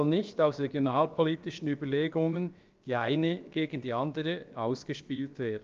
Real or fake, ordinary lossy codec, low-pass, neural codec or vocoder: fake; Opus, 24 kbps; 7.2 kHz; codec, 16 kHz, about 1 kbps, DyCAST, with the encoder's durations